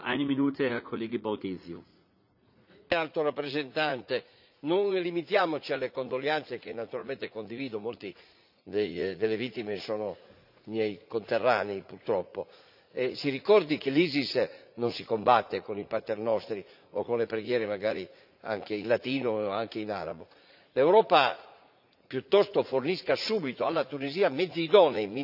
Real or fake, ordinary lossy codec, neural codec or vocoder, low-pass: fake; none; vocoder, 44.1 kHz, 80 mel bands, Vocos; 5.4 kHz